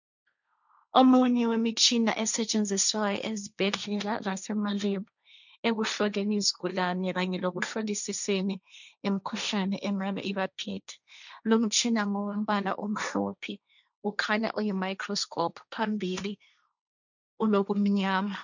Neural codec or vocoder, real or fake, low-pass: codec, 16 kHz, 1.1 kbps, Voila-Tokenizer; fake; 7.2 kHz